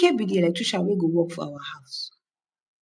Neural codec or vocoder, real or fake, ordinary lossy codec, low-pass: none; real; none; 9.9 kHz